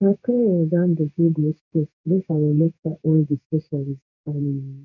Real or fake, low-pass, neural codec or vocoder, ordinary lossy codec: fake; 7.2 kHz; codec, 16 kHz in and 24 kHz out, 1 kbps, XY-Tokenizer; none